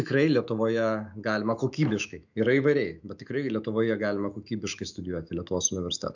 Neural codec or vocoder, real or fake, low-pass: autoencoder, 48 kHz, 128 numbers a frame, DAC-VAE, trained on Japanese speech; fake; 7.2 kHz